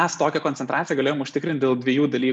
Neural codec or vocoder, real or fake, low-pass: none; real; 9.9 kHz